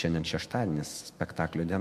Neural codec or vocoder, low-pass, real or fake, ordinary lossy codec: autoencoder, 48 kHz, 128 numbers a frame, DAC-VAE, trained on Japanese speech; 14.4 kHz; fake; MP3, 64 kbps